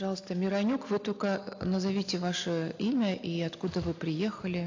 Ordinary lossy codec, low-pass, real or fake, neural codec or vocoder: AAC, 48 kbps; 7.2 kHz; real; none